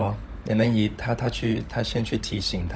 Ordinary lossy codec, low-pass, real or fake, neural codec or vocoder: none; none; fake; codec, 16 kHz, 16 kbps, FunCodec, trained on LibriTTS, 50 frames a second